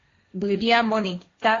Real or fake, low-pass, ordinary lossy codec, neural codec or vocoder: fake; 7.2 kHz; AAC, 32 kbps; codec, 16 kHz, 1.1 kbps, Voila-Tokenizer